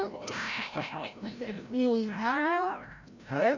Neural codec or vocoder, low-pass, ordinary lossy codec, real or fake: codec, 16 kHz, 0.5 kbps, FreqCodec, larger model; 7.2 kHz; none; fake